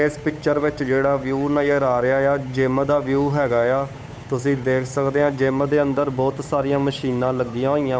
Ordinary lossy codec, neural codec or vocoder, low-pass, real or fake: none; codec, 16 kHz, 8 kbps, FunCodec, trained on Chinese and English, 25 frames a second; none; fake